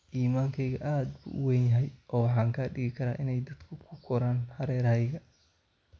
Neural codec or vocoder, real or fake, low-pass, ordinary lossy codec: none; real; none; none